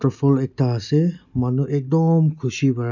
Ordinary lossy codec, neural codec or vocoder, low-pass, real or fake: none; none; 7.2 kHz; real